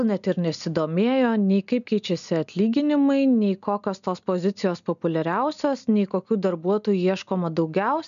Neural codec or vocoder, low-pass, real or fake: none; 7.2 kHz; real